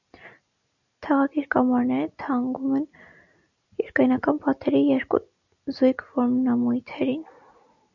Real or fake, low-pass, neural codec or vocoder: real; 7.2 kHz; none